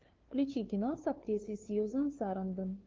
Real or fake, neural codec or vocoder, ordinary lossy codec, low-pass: fake; codec, 16 kHz, 2 kbps, FunCodec, trained on LibriTTS, 25 frames a second; Opus, 16 kbps; 7.2 kHz